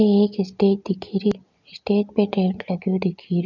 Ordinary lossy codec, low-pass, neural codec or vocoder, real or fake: none; 7.2 kHz; none; real